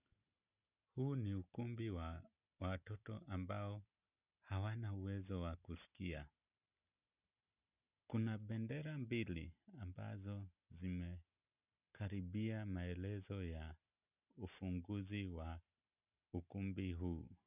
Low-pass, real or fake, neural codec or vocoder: 3.6 kHz; real; none